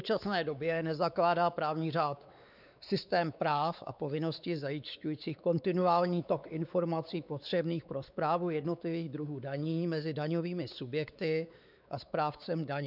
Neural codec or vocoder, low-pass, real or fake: codec, 16 kHz, 4 kbps, X-Codec, WavLM features, trained on Multilingual LibriSpeech; 5.4 kHz; fake